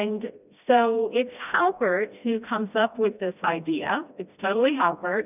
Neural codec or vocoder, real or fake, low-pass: codec, 16 kHz, 1 kbps, FreqCodec, smaller model; fake; 3.6 kHz